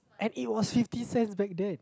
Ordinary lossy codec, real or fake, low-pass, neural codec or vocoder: none; real; none; none